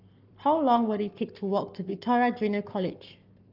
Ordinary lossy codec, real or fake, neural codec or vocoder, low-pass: Opus, 32 kbps; fake; codec, 44.1 kHz, 7.8 kbps, Pupu-Codec; 5.4 kHz